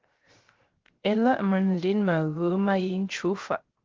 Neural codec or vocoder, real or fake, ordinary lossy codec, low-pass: codec, 16 kHz, 0.3 kbps, FocalCodec; fake; Opus, 16 kbps; 7.2 kHz